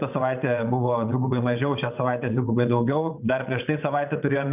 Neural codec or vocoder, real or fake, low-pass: vocoder, 22.05 kHz, 80 mel bands, WaveNeXt; fake; 3.6 kHz